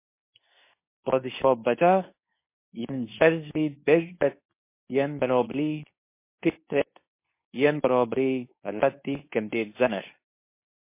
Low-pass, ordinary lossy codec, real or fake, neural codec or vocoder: 3.6 kHz; MP3, 24 kbps; fake; codec, 24 kHz, 0.9 kbps, WavTokenizer, medium speech release version 2